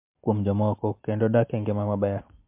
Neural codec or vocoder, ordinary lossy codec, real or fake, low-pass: none; MP3, 32 kbps; real; 3.6 kHz